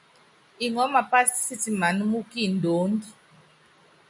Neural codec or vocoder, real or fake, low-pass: none; real; 10.8 kHz